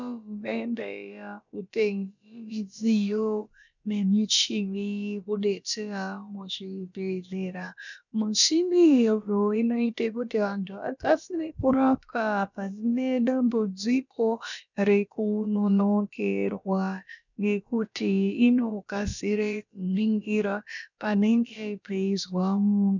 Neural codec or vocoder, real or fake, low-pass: codec, 16 kHz, about 1 kbps, DyCAST, with the encoder's durations; fake; 7.2 kHz